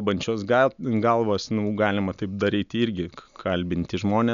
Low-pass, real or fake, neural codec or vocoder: 7.2 kHz; real; none